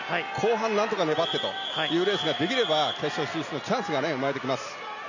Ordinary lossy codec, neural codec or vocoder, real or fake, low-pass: none; none; real; 7.2 kHz